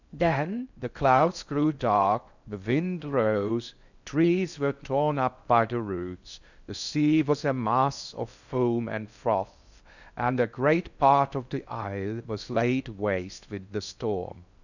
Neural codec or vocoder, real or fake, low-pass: codec, 16 kHz in and 24 kHz out, 0.6 kbps, FocalCodec, streaming, 4096 codes; fake; 7.2 kHz